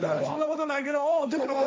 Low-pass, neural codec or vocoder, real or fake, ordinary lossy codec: none; codec, 16 kHz, 1.1 kbps, Voila-Tokenizer; fake; none